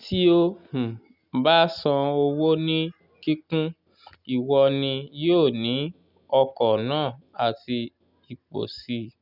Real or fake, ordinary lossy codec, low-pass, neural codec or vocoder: real; none; 5.4 kHz; none